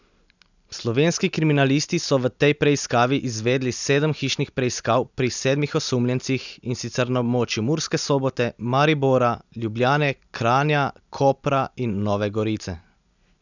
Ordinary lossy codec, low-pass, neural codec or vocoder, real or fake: none; 7.2 kHz; none; real